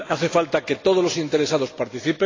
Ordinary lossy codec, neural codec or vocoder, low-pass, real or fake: AAC, 32 kbps; none; 7.2 kHz; real